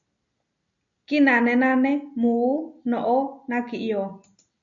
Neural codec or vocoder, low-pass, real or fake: none; 7.2 kHz; real